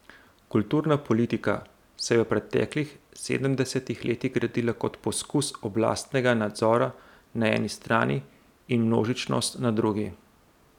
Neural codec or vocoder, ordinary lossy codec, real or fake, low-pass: none; none; real; 19.8 kHz